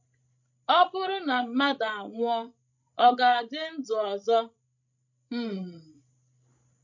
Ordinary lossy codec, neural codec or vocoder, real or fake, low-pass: MP3, 48 kbps; codec, 16 kHz, 16 kbps, FreqCodec, larger model; fake; 7.2 kHz